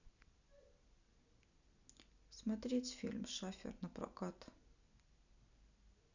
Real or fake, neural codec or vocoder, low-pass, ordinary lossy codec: real; none; 7.2 kHz; none